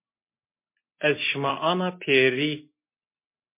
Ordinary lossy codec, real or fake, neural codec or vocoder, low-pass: MP3, 24 kbps; real; none; 3.6 kHz